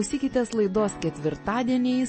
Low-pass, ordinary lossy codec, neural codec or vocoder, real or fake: 9.9 kHz; MP3, 32 kbps; none; real